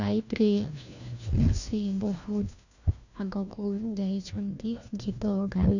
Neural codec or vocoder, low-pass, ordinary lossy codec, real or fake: codec, 16 kHz, 1 kbps, FunCodec, trained on LibriTTS, 50 frames a second; 7.2 kHz; none; fake